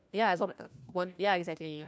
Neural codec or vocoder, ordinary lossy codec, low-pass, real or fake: codec, 16 kHz, 1 kbps, FunCodec, trained on LibriTTS, 50 frames a second; none; none; fake